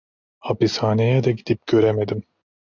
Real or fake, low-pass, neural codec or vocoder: real; 7.2 kHz; none